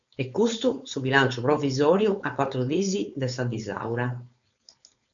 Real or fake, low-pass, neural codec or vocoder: fake; 7.2 kHz; codec, 16 kHz, 4.8 kbps, FACodec